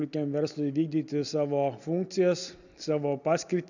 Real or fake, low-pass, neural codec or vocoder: real; 7.2 kHz; none